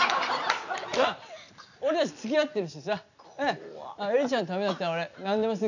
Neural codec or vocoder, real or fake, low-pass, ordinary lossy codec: none; real; 7.2 kHz; none